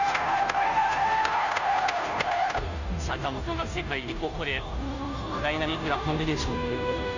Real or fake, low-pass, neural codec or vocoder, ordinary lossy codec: fake; 7.2 kHz; codec, 16 kHz, 0.5 kbps, FunCodec, trained on Chinese and English, 25 frames a second; none